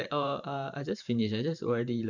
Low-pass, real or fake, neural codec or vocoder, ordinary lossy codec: 7.2 kHz; fake; vocoder, 44.1 kHz, 128 mel bands every 512 samples, BigVGAN v2; none